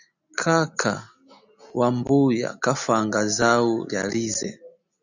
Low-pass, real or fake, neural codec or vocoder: 7.2 kHz; real; none